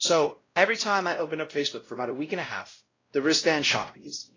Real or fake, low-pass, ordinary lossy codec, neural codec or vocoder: fake; 7.2 kHz; AAC, 32 kbps; codec, 16 kHz, 0.5 kbps, X-Codec, WavLM features, trained on Multilingual LibriSpeech